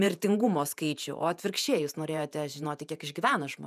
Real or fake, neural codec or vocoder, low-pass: fake; vocoder, 48 kHz, 128 mel bands, Vocos; 14.4 kHz